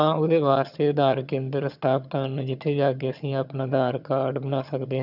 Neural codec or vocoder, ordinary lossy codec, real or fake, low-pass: vocoder, 22.05 kHz, 80 mel bands, HiFi-GAN; none; fake; 5.4 kHz